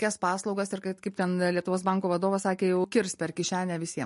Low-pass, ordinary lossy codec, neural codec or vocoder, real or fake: 14.4 kHz; MP3, 48 kbps; none; real